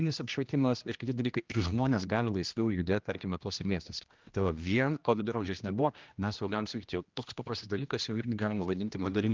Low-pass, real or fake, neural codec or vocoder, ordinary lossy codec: 7.2 kHz; fake; codec, 16 kHz, 1 kbps, X-Codec, HuBERT features, trained on general audio; Opus, 32 kbps